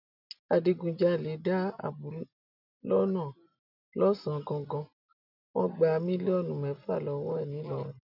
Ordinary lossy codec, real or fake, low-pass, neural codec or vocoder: none; real; 5.4 kHz; none